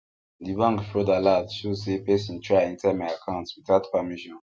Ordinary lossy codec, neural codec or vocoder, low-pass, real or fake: none; none; none; real